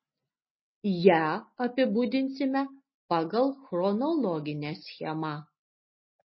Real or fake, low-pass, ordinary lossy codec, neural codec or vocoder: real; 7.2 kHz; MP3, 24 kbps; none